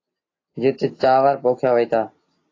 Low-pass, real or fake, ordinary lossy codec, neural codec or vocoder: 7.2 kHz; real; AAC, 32 kbps; none